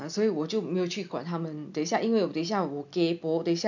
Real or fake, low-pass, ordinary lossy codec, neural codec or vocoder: real; 7.2 kHz; none; none